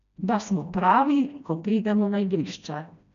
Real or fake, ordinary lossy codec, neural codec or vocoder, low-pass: fake; none; codec, 16 kHz, 1 kbps, FreqCodec, smaller model; 7.2 kHz